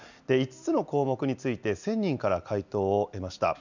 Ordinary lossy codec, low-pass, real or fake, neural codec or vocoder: none; 7.2 kHz; real; none